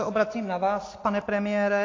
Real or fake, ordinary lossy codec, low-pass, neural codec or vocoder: real; AAC, 32 kbps; 7.2 kHz; none